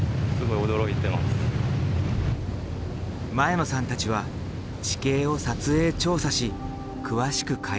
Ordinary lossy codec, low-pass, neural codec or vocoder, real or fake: none; none; none; real